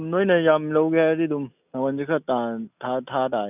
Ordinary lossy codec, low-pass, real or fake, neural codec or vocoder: none; 3.6 kHz; real; none